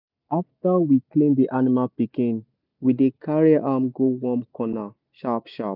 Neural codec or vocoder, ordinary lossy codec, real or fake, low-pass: none; none; real; 5.4 kHz